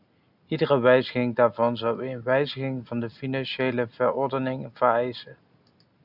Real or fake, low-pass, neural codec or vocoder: real; 5.4 kHz; none